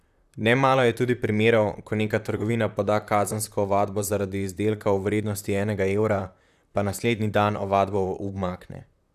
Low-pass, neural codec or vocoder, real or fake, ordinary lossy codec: 14.4 kHz; vocoder, 44.1 kHz, 128 mel bands, Pupu-Vocoder; fake; none